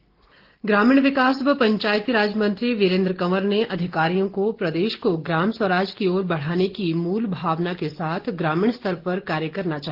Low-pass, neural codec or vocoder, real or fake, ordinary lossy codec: 5.4 kHz; none; real; Opus, 16 kbps